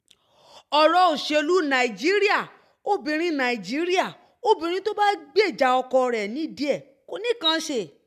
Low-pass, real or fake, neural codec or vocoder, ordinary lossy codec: 14.4 kHz; real; none; MP3, 96 kbps